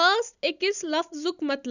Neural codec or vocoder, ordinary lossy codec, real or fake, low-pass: none; none; real; 7.2 kHz